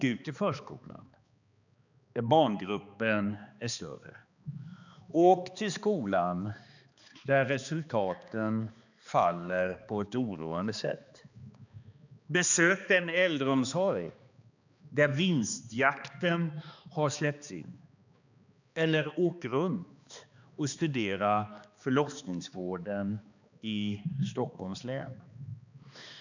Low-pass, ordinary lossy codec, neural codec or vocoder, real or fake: 7.2 kHz; none; codec, 16 kHz, 2 kbps, X-Codec, HuBERT features, trained on balanced general audio; fake